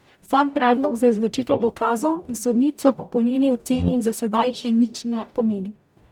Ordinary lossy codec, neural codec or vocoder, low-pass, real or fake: none; codec, 44.1 kHz, 0.9 kbps, DAC; 19.8 kHz; fake